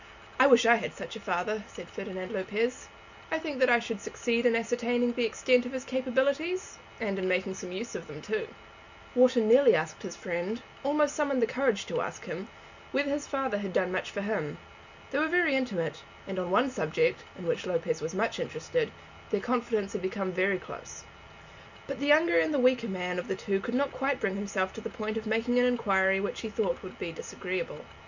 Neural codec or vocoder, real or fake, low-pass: none; real; 7.2 kHz